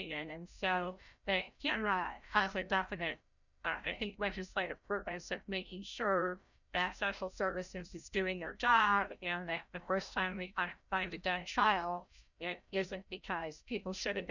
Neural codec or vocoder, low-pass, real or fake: codec, 16 kHz, 0.5 kbps, FreqCodec, larger model; 7.2 kHz; fake